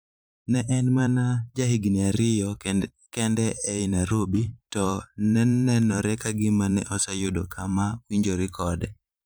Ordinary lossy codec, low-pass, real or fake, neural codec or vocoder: none; none; fake; vocoder, 44.1 kHz, 128 mel bands every 512 samples, BigVGAN v2